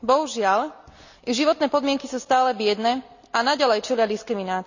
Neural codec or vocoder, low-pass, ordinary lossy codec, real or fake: none; 7.2 kHz; none; real